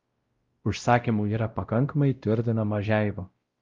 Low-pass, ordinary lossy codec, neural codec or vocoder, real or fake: 7.2 kHz; Opus, 32 kbps; codec, 16 kHz, 0.5 kbps, X-Codec, WavLM features, trained on Multilingual LibriSpeech; fake